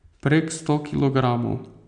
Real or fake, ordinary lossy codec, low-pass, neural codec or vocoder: real; none; 9.9 kHz; none